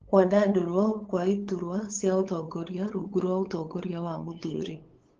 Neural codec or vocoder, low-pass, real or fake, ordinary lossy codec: codec, 16 kHz, 8 kbps, FunCodec, trained on LibriTTS, 25 frames a second; 7.2 kHz; fake; Opus, 16 kbps